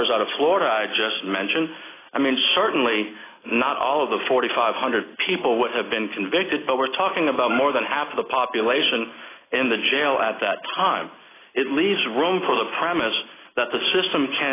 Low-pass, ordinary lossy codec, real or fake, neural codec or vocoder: 3.6 kHz; AAC, 16 kbps; real; none